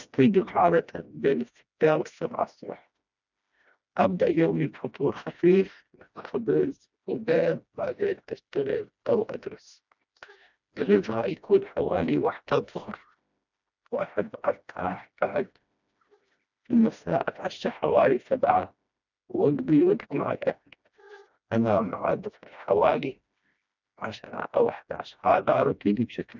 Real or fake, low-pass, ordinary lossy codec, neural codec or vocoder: fake; 7.2 kHz; none; codec, 16 kHz, 1 kbps, FreqCodec, smaller model